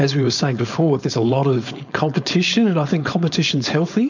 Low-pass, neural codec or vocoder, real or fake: 7.2 kHz; codec, 16 kHz, 4.8 kbps, FACodec; fake